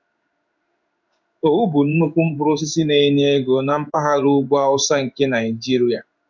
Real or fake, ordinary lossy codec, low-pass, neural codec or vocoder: fake; none; 7.2 kHz; codec, 16 kHz in and 24 kHz out, 1 kbps, XY-Tokenizer